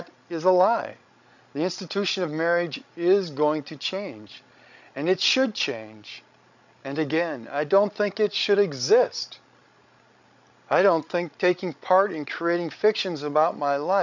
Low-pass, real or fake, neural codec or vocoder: 7.2 kHz; fake; codec, 16 kHz, 16 kbps, FreqCodec, larger model